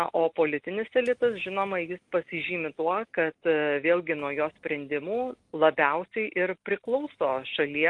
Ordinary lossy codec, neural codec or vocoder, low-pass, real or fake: Opus, 32 kbps; none; 10.8 kHz; real